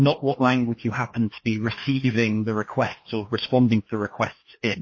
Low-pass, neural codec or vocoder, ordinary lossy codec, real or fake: 7.2 kHz; codec, 16 kHz, 2 kbps, FreqCodec, larger model; MP3, 32 kbps; fake